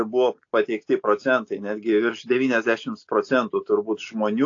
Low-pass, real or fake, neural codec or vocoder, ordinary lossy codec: 7.2 kHz; real; none; AAC, 48 kbps